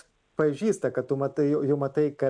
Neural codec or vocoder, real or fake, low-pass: none; real; 9.9 kHz